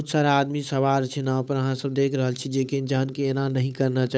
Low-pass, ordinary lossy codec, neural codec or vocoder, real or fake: none; none; codec, 16 kHz, 8 kbps, FunCodec, trained on Chinese and English, 25 frames a second; fake